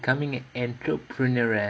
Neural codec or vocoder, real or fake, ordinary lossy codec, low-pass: none; real; none; none